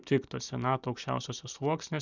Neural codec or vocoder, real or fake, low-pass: none; real; 7.2 kHz